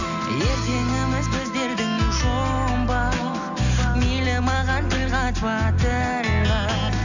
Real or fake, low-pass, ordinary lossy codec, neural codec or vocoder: real; 7.2 kHz; none; none